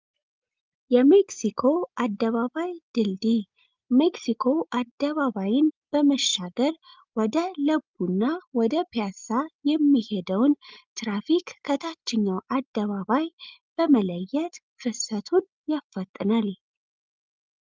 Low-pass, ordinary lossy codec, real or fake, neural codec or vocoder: 7.2 kHz; Opus, 24 kbps; real; none